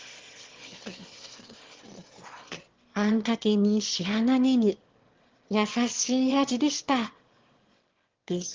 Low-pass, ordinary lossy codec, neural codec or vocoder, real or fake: 7.2 kHz; Opus, 16 kbps; autoencoder, 22.05 kHz, a latent of 192 numbers a frame, VITS, trained on one speaker; fake